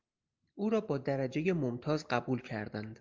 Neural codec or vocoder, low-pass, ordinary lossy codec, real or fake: none; 7.2 kHz; Opus, 24 kbps; real